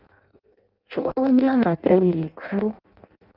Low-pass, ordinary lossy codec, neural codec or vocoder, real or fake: 5.4 kHz; Opus, 32 kbps; codec, 16 kHz in and 24 kHz out, 0.6 kbps, FireRedTTS-2 codec; fake